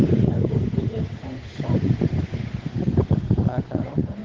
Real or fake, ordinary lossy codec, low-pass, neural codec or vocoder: fake; Opus, 16 kbps; 7.2 kHz; codec, 16 kHz, 8 kbps, FunCodec, trained on Chinese and English, 25 frames a second